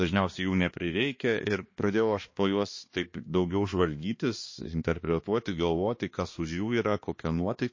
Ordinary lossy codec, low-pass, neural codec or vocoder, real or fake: MP3, 32 kbps; 7.2 kHz; codec, 16 kHz, 2 kbps, X-Codec, HuBERT features, trained on balanced general audio; fake